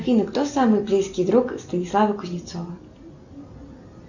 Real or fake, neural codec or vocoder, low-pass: real; none; 7.2 kHz